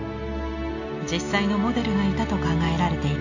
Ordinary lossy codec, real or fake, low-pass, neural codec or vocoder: AAC, 48 kbps; real; 7.2 kHz; none